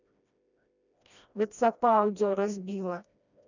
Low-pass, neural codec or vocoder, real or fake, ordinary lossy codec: 7.2 kHz; codec, 16 kHz, 1 kbps, FreqCodec, smaller model; fake; none